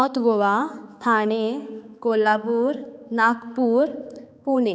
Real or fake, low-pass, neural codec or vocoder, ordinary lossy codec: fake; none; codec, 16 kHz, 4 kbps, X-Codec, HuBERT features, trained on balanced general audio; none